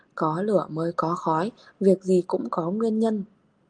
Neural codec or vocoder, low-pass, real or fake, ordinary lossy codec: none; 9.9 kHz; real; Opus, 24 kbps